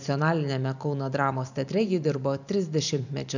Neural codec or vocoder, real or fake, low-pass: vocoder, 44.1 kHz, 128 mel bands every 512 samples, BigVGAN v2; fake; 7.2 kHz